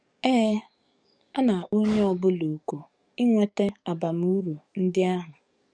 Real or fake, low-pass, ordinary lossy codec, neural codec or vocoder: fake; 9.9 kHz; none; codec, 44.1 kHz, 7.8 kbps, DAC